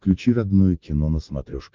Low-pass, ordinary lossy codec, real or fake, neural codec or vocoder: 7.2 kHz; Opus, 32 kbps; real; none